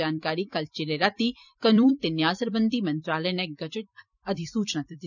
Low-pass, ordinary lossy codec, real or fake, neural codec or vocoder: 7.2 kHz; none; fake; vocoder, 44.1 kHz, 128 mel bands every 256 samples, BigVGAN v2